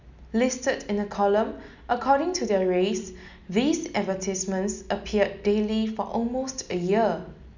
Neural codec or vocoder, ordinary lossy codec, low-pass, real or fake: none; none; 7.2 kHz; real